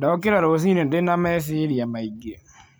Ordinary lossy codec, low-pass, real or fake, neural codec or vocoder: none; none; real; none